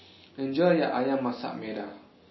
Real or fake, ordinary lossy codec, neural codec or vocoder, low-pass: real; MP3, 24 kbps; none; 7.2 kHz